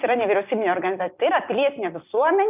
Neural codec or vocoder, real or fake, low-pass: none; real; 3.6 kHz